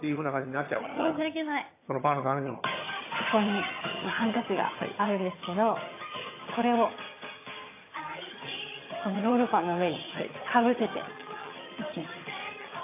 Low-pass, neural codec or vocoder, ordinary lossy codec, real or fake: 3.6 kHz; vocoder, 22.05 kHz, 80 mel bands, HiFi-GAN; AAC, 24 kbps; fake